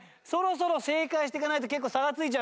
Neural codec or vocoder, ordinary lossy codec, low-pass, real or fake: none; none; none; real